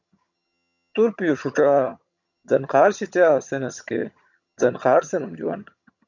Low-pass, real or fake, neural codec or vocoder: 7.2 kHz; fake; vocoder, 22.05 kHz, 80 mel bands, HiFi-GAN